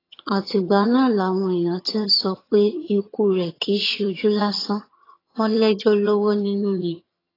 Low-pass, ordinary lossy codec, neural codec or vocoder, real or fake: 5.4 kHz; AAC, 24 kbps; vocoder, 22.05 kHz, 80 mel bands, HiFi-GAN; fake